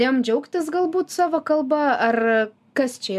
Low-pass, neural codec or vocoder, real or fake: 14.4 kHz; none; real